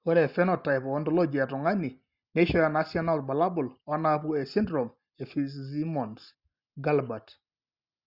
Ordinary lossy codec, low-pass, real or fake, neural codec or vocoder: Opus, 64 kbps; 5.4 kHz; real; none